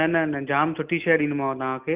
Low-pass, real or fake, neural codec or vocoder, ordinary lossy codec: 3.6 kHz; real; none; Opus, 24 kbps